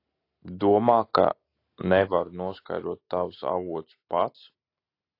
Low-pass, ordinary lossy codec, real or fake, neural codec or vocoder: 5.4 kHz; AAC, 32 kbps; real; none